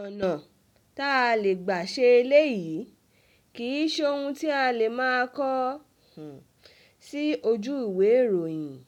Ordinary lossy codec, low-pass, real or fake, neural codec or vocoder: none; 19.8 kHz; real; none